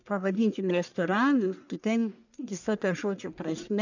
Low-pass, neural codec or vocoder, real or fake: 7.2 kHz; codec, 44.1 kHz, 1.7 kbps, Pupu-Codec; fake